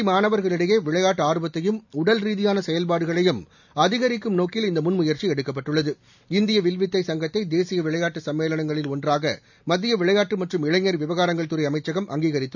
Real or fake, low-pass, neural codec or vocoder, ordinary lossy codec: real; 7.2 kHz; none; none